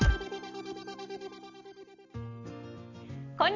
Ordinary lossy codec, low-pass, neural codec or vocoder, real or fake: none; 7.2 kHz; none; real